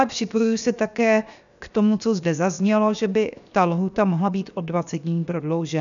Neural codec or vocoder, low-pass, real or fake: codec, 16 kHz, 0.7 kbps, FocalCodec; 7.2 kHz; fake